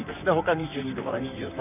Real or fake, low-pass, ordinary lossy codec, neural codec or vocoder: fake; 3.6 kHz; none; vocoder, 44.1 kHz, 128 mel bands, Pupu-Vocoder